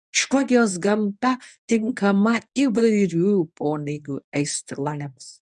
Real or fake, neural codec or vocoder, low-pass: fake; codec, 24 kHz, 0.9 kbps, WavTokenizer, medium speech release version 1; 10.8 kHz